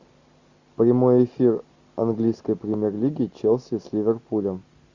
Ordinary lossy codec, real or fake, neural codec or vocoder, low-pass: Opus, 64 kbps; real; none; 7.2 kHz